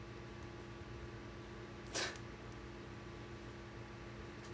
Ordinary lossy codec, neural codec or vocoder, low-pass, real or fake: none; none; none; real